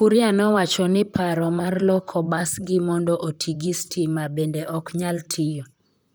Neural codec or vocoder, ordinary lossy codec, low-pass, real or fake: vocoder, 44.1 kHz, 128 mel bands, Pupu-Vocoder; none; none; fake